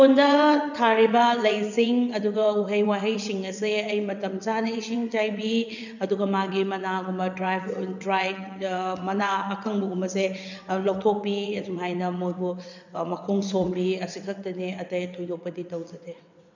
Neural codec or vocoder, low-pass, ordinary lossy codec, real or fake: vocoder, 22.05 kHz, 80 mel bands, WaveNeXt; 7.2 kHz; none; fake